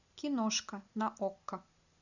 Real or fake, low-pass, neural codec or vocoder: real; 7.2 kHz; none